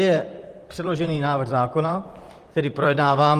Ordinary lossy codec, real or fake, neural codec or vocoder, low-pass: Opus, 24 kbps; fake; vocoder, 44.1 kHz, 128 mel bands, Pupu-Vocoder; 14.4 kHz